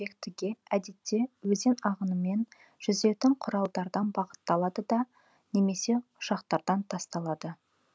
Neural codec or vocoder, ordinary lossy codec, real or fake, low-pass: none; none; real; none